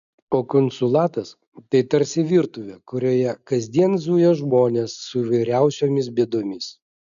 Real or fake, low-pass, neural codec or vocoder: real; 7.2 kHz; none